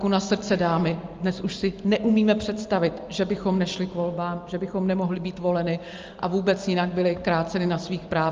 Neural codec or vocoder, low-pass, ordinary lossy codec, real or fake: none; 7.2 kHz; Opus, 32 kbps; real